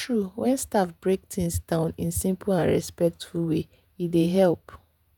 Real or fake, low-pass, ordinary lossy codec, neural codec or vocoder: fake; none; none; vocoder, 48 kHz, 128 mel bands, Vocos